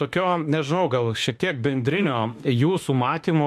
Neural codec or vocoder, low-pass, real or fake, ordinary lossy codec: autoencoder, 48 kHz, 32 numbers a frame, DAC-VAE, trained on Japanese speech; 14.4 kHz; fake; MP3, 64 kbps